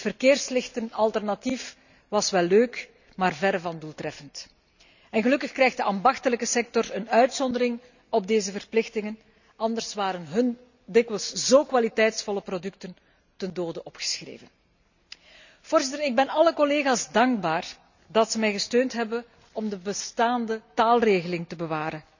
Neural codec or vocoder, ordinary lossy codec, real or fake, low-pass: none; none; real; 7.2 kHz